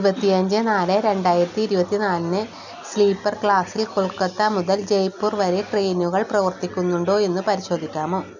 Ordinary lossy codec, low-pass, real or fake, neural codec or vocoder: none; 7.2 kHz; real; none